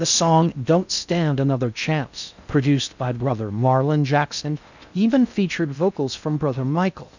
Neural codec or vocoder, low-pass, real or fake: codec, 16 kHz in and 24 kHz out, 0.6 kbps, FocalCodec, streaming, 4096 codes; 7.2 kHz; fake